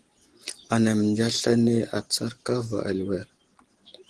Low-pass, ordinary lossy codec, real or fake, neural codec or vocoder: 9.9 kHz; Opus, 16 kbps; real; none